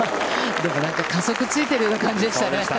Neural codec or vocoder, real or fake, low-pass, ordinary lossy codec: none; real; none; none